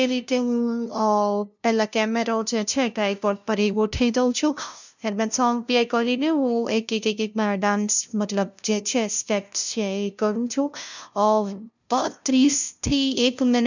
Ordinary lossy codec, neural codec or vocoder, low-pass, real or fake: none; codec, 16 kHz, 0.5 kbps, FunCodec, trained on LibriTTS, 25 frames a second; 7.2 kHz; fake